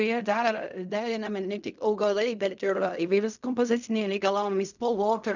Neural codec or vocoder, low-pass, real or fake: codec, 16 kHz in and 24 kHz out, 0.4 kbps, LongCat-Audio-Codec, fine tuned four codebook decoder; 7.2 kHz; fake